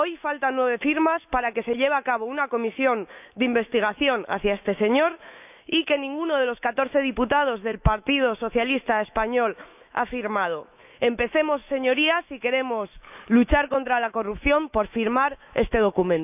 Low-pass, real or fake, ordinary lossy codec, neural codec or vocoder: 3.6 kHz; fake; none; autoencoder, 48 kHz, 128 numbers a frame, DAC-VAE, trained on Japanese speech